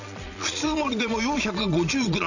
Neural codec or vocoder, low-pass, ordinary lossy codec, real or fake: vocoder, 22.05 kHz, 80 mel bands, Vocos; 7.2 kHz; none; fake